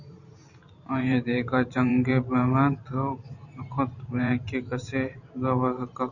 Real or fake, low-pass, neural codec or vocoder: fake; 7.2 kHz; vocoder, 44.1 kHz, 128 mel bands every 512 samples, BigVGAN v2